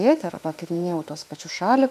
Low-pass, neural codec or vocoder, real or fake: 14.4 kHz; autoencoder, 48 kHz, 32 numbers a frame, DAC-VAE, trained on Japanese speech; fake